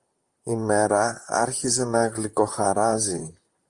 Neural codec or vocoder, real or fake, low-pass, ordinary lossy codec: vocoder, 44.1 kHz, 128 mel bands, Pupu-Vocoder; fake; 10.8 kHz; Opus, 32 kbps